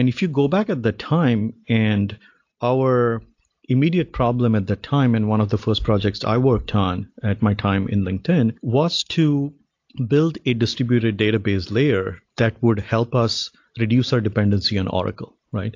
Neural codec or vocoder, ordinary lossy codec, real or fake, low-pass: none; AAC, 48 kbps; real; 7.2 kHz